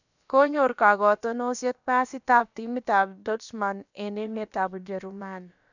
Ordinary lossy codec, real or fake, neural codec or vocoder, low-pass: none; fake; codec, 16 kHz, about 1 kbps, DyCAST, with the encoder's durations; 7.2 kHz